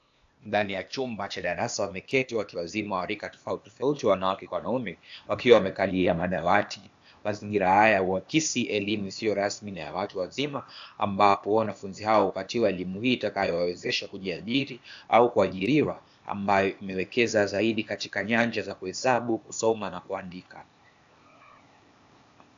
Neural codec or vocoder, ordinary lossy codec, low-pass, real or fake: codec, 16 kHz, 0.8 kbps, ZipCodec; AAC, 64 kbps; 7.2 kHz; fake